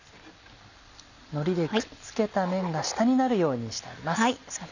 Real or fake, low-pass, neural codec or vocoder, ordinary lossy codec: real; 7.2 kHz; none; none